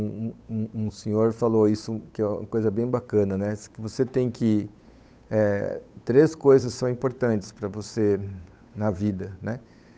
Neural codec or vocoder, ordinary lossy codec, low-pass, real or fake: codec, 16 kHz, 8 kbps, FunCodec, trained on Chinese and English, 25 frames a second; none; none; fake